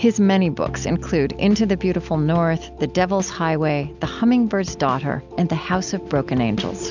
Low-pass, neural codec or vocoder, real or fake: 7.2 kHz; none; real